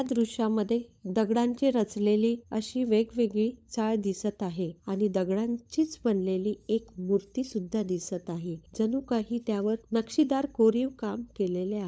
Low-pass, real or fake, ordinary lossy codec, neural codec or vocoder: none; fake; none; codec, 16 kHz, 16 kbps, FunCodec, trained on LibriTTS, 50 frames a second